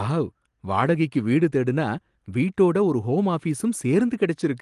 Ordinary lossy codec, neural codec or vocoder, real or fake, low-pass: Opus, 24 kbps; none; real; 10.8 kHz